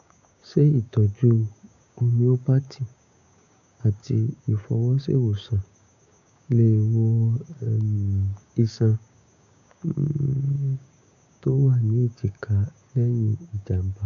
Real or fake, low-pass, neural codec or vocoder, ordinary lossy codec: fake; 7.2 kHz; codec, 16 kHz, 6 kbps, DAC; none